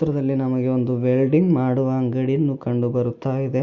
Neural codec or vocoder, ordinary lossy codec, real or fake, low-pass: none; Opus, 64 kbps; real; 7.2 kHz